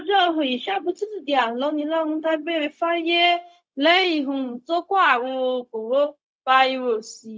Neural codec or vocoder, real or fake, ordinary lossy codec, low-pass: codec, 16 kHz, 0.4 kbps, LongCat-Audio-Codec; fake; none; none